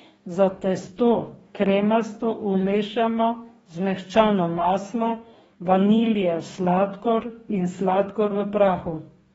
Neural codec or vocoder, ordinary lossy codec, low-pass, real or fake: codec, 44.1 kHz, 2.6 kbps, DAC; AAC, 24 kbps; 19.8 kHz; fake